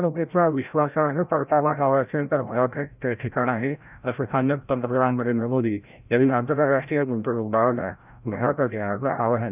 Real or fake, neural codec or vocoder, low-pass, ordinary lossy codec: fake; codec, 16 kHz, 0.5 kbps, FreqCodec, larger model; 3.6 kHz; none